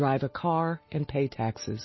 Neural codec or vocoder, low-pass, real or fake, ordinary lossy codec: none; 7.2 kHz; real; MP3, 24 kbps